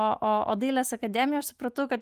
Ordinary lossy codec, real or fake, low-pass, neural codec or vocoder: Opus, 24 kbps; fake; 14.4 kHz; autoencoder, 48 kHz, 128 numbers a frame, DAC-VAE, trained on Japanese speech